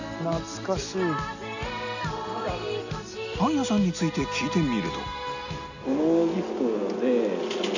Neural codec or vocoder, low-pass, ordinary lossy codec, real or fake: none; 7.2 kHz; none; real